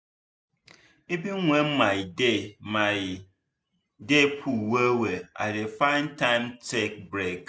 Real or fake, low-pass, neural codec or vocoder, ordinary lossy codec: real; none; none; none